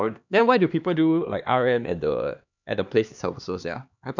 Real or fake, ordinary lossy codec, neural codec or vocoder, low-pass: fake; none; codec, 16 kHz, 2 kbps, X-Codec, HuBERT features, trained on LibriSpeech; 7.2 kHz